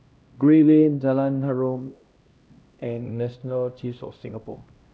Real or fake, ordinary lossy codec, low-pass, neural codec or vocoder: fake; none; none; codec, 16 kHz, 1 kbps, X-Codec, HuBERT features, trained on LibriSpeech